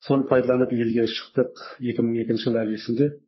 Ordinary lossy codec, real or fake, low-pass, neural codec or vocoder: MP3, 24 kbps; fake; 7.2 kHz; codec, 44.1 kHz, 3.4 kbps, Pupu-Codec